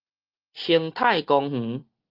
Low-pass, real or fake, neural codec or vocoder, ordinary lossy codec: 5.4 kHz; real; none; Opus, 32 kbps